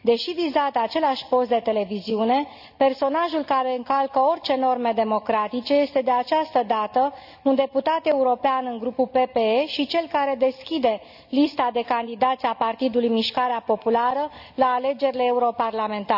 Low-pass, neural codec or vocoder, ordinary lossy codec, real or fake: 5.4 kHz; none; none; real